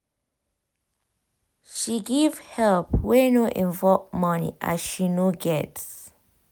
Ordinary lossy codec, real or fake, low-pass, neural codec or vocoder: none; real; none; none